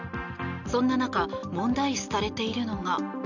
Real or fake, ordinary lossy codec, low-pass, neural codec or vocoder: real; none; 7.2 kHz; none